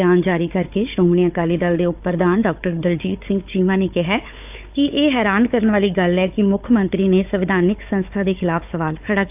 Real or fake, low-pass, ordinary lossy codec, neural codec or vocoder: fake; 3.6 kHz; AAC, 32 kbps; codec, 16 kHz, 4 kbps, FunCodec, trained on Chinese and English, 50 frames a second